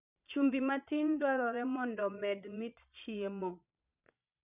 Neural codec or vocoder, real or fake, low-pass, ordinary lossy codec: vocoder, 22.05 kHz, 80 mel bands, Vocos; fake; 3.6 kHz; none